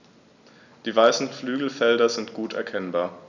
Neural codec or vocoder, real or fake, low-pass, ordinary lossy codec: none; real; 7.2 kHz; none